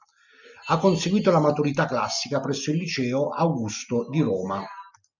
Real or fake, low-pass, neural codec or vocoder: real; 7.2 kHz; none